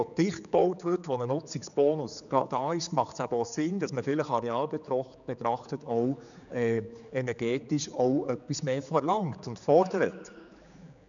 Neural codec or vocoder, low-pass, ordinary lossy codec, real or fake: codec, 16 kHz, 4 kbps, X-Codec, HuBERT features, trained on general audio; 7.2 kHz; none; fake